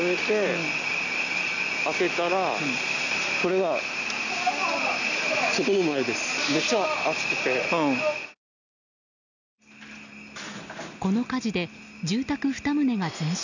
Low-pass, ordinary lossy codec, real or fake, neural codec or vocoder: 7.2 kHz; none; real; none